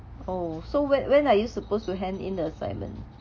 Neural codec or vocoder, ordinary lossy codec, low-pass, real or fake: none; none; none; real